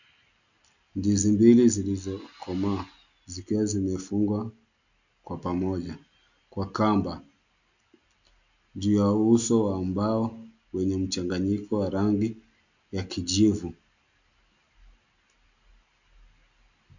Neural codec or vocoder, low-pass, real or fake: none; 7.2 kHz; real